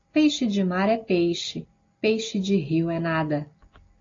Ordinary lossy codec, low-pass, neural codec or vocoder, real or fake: AAC, 32 kbps; 7.2 kHz; none; real